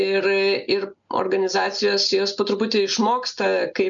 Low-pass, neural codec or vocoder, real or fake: 7.2 kHz; none; real